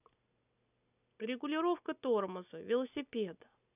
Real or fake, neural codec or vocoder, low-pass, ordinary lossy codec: real; none; 3.6 kHz; none